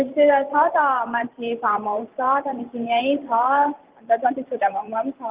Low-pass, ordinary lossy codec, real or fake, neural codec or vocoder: 3.6 kHz; Opus, 32 kbps; fake; vocoder, 44.1 kHz, 128 mel bands every 512 samples, BigVGAN v2